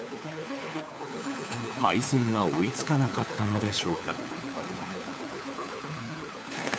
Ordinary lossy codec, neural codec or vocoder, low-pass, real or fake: none; codec, 16 kHz, 4 kbps, FunCodec, trained on LibriTTS, 50 frames a second; none; fake